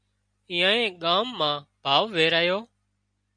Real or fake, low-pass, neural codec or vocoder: real; 9.9 kHz; none